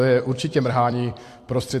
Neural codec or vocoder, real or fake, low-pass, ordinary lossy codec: vocoder, 44.1 kHz, 128 mel bands every 256 samples, BigVGAN v2; fake; 14.4 kHz; AAC, 64 kbps